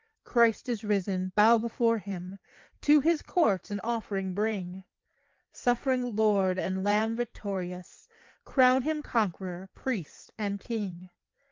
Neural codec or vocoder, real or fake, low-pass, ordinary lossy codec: codec, 16 kHz in and 24 kHz out, 2.2 kbps, FireRedTTS-2 codec; fake; 7.2 kHz; Opus, 24 kbps